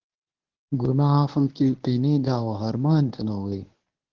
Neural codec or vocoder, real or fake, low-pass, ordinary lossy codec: codec, 24 kHz, 0.9 kbps, WavTokenizer, medium speech release version 1; fake; 7.2 kHz; Opus, 16 kbps